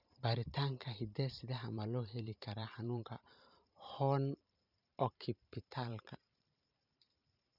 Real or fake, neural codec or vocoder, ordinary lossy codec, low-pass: fake; vocoder, 44.1 kHz, 128 mel bands every 512 samples, BigVGAN v2; none; 5.4 kHz